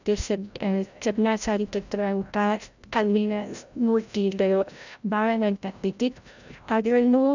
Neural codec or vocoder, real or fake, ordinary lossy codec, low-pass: codec, 16 kHz, 0.5 kbps, FreqCodec, larger model; fake; none; 7.2 kHz